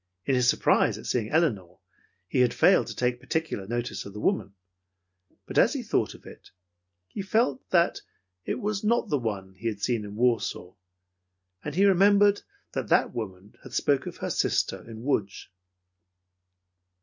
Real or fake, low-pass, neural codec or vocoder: real; 7.2 kHz; none